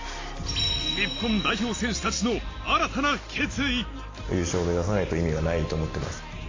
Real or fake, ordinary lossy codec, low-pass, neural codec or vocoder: real; AAC, 32 kbps; 7.2 kHz; none